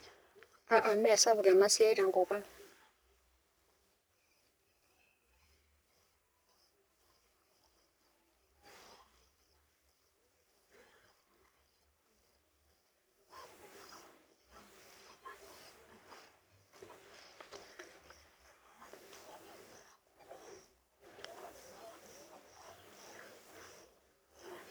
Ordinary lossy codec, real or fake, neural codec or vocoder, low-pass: none; fake; codec, 44.1 kHz, 3.4 kbps, Pupu-Codec; none